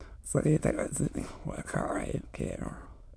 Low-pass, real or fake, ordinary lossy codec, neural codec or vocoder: none; fake; none; autoencoder, 22.05 kHz, a latent of 192 numbers a frame, VITS, trained on many speakers